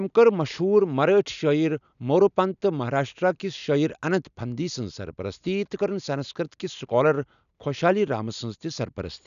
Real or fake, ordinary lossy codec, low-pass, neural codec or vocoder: real; none; 7.2 kHz; none